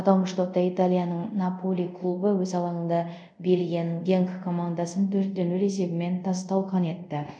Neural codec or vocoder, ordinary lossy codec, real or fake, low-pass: codec, 24 kHz, 0.5 kbps, DualCodec; none; fake; 9.9 kHz